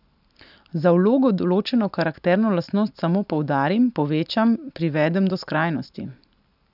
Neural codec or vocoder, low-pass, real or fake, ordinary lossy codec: none; 5.4 kHz; real; none